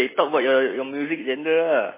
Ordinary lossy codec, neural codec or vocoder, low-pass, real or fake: MP3, 16 kbps; none; 3.6 kHz; real